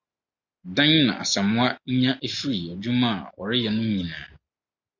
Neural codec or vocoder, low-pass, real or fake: none; 7.2 kHz; real